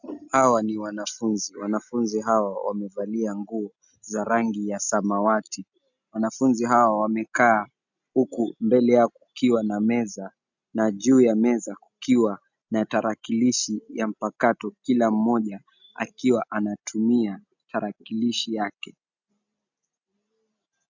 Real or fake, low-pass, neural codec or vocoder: real; 7.2 kHz; none